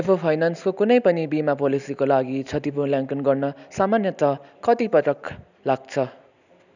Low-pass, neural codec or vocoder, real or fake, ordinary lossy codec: 7.2 kHz; none; real; none